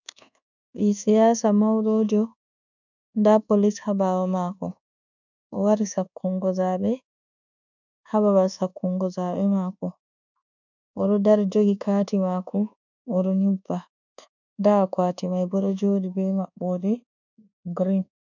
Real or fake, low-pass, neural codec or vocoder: fake; 7.2 kHz; codec, 24 kHz, 1.2 kbps, DualCodec